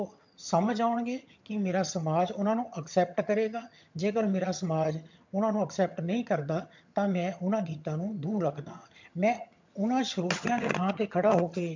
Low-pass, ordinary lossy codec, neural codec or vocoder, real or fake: 7.2 kHz; MP3, 64 kbps; vocoder, 22.05 kHz, 80 mel bands, HiFi-GAN; fake